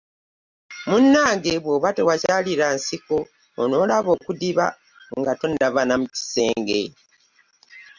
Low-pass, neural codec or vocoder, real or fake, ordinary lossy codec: 7.2 kHz; none; real; Opus, 64 kbps